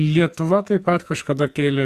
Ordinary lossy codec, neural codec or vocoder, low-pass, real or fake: Opus, 64 kbps; codec, 44.1 kHz, 2.6 kbps, SNAC; 14.4 kHz; fake